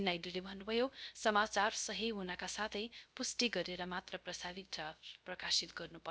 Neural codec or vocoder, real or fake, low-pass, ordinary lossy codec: codec, 16 kHz, 0.3 kbps, FocalCodec; fake; none; none